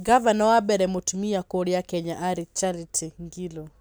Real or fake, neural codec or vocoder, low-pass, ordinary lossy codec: real; none; none; none